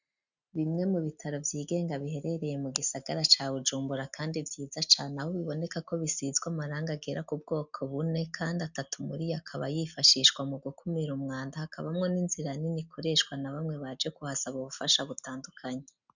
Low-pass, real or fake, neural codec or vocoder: 7.2 kHz; real; none